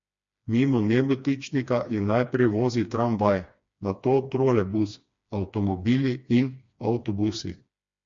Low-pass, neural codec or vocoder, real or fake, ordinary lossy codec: 7.2 kHz; codec, 16 kHz, 2 kbps, FreqCodec, smaller model; fake; MP3, 48 kbps